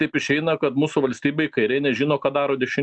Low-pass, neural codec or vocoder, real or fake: 9.9 kHz; none; real